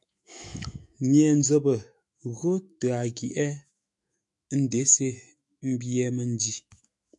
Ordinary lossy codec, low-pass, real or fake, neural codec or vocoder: MP3, 96 kbps; 10.8 kHz; fake; autoencoder, 48 kHz, 128 numbers a frame, DAC-VAE, trained on Japanese speech